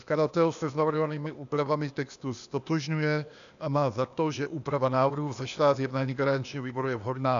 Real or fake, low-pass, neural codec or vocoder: fake; 7.2 kHz; codec, 16 kHz, 0.8 kbps, ZipCodec